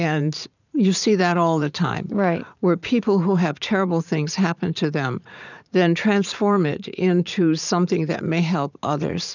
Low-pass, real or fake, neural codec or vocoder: 7.2 kHz; fake; vocoder, 44.1 kHz, 80 mel bands, Vocos